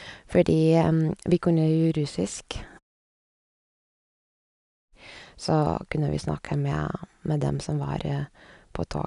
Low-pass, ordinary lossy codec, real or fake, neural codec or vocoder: 10.8 kHz; none; real; none